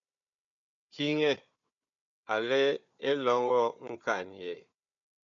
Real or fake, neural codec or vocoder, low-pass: fake; codec, 16 kHz, 4 kbps, FunCodec, trained on Chinese and English, 50 frames a second; 7.2 kHz